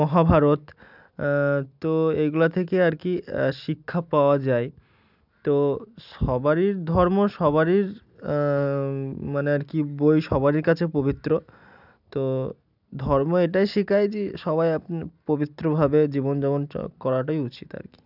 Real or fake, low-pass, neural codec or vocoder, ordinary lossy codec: real; 5.4 kHz; none; none